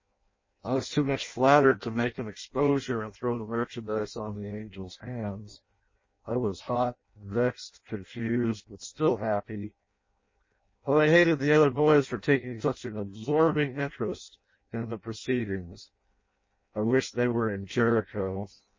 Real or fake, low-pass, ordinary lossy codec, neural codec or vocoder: fake; 7.2 kHz; MP3, 32 kbps; codec, 16 kHz in and 24 kHz out, 0.6 kbps, FireRedTTS-2 codec